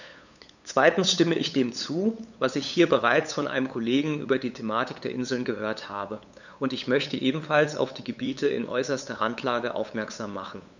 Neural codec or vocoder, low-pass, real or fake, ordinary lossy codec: codec, 16 kHz, 8 kbps, FunCodec, trained on LibriTTS, 25 frames a second; 7.2 kHz; fake; none